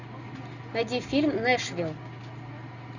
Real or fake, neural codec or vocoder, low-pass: real; none; 7.2 kHz